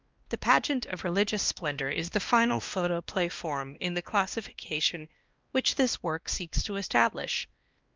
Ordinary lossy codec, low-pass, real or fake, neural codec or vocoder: Opus, 24 kbps; 7.2 kHz; fake; codec, 16 kHz, 1 kbps, X-Codec, WavLM features, trained on Multilingual LibriSpeech